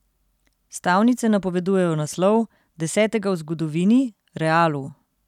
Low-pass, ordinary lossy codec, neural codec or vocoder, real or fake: 19.8 kHz; none; none; real